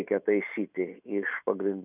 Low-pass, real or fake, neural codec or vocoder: 3.6 kHz; real; none